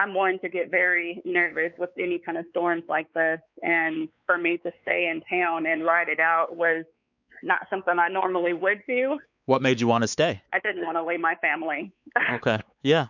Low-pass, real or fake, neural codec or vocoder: 7.2 kHz; fake; codec, 16 kHz, 4 kbps, X-Codec, WavLM features, trained on Multilingual LibriSpeech